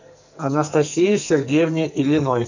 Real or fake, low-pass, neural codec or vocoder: fake; 7.2 kHz; codec, 44.1 kHz, 2.6 kbps, SNAC